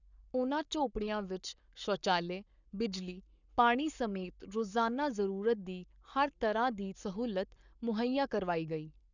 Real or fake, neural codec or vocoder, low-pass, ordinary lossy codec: fake; codec, 44.1 kHz, 7.8 kbps, Pupu-Codec; 7.2 kHz; none